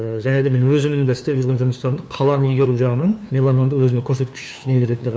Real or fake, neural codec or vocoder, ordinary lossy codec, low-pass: fake; codec, 16 kHz, 2 kbps, FunCodec, trained on LibriTTS, 25 frames a second; none; none